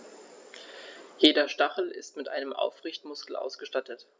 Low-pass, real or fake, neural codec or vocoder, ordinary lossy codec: none; real; none; none